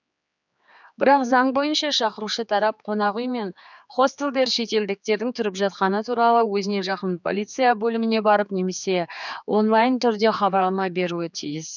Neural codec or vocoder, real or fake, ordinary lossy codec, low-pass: codec, 16 kHz, 4 kbps, X-Codec, HuBERT features, trained on general audio; fake; none; 7.2 kHz